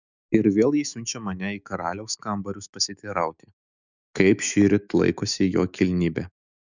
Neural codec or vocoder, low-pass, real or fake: autoencoder, 48 kHz, 128 numbers a frame, DAC-VAE, trained on Japanese speech; 7.2 kHz; fake